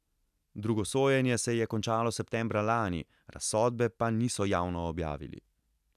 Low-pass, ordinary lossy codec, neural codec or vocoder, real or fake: 14.4 kHz; none; none; real